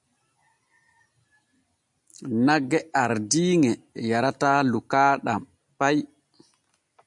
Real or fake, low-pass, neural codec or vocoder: real; 10.8 kHz; none